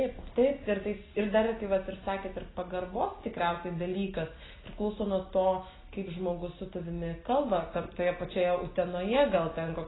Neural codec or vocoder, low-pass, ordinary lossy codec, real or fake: none; 7.2 kHz; AAC, 16 kbps; real